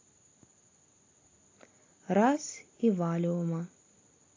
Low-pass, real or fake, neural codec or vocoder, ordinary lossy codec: 7.2 kHz; real; none; AAC, 32 kbps